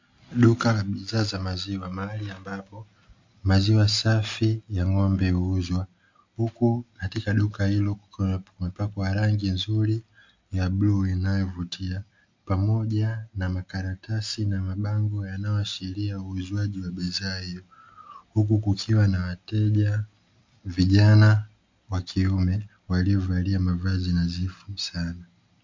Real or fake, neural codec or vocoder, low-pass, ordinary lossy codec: real; none; 7.2 kHz; MP3, 48 kbps